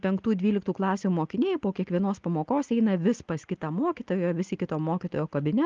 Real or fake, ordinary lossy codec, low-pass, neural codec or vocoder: real; Opus, 32 kbps; 7.2 kHz; none